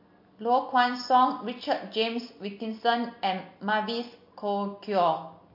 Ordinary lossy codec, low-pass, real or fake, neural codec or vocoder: MP3, 32 kbps; 5.4 kHz; real; none